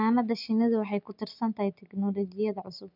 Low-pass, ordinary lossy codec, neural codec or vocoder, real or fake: 5.4 kHz; none; none; real